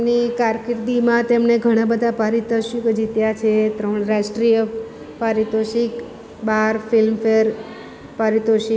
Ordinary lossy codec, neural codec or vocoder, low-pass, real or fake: none; none; none; real